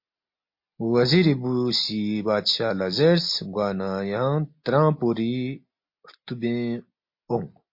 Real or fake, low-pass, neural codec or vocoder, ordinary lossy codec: real; 5.4 kHz; none; MP3, 32 kbps